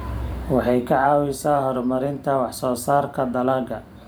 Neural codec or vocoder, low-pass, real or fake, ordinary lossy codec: vocoder, 44.1 kHz, 128 mel bands every 512 samples, BigVGAN v2; none; fake; none